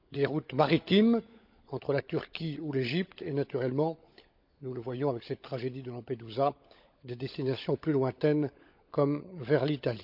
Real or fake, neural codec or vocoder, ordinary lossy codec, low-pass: fake; codec, 16 kHz, 16 kbps, FunCodec, trained on LibriTTS, 50 frames a second; none; 5.4 kHz